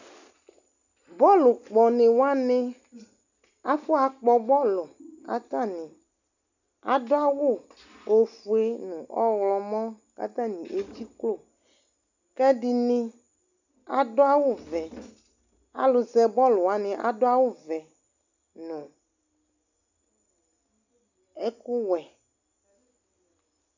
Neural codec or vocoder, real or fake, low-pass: none; real; 7.2 kHz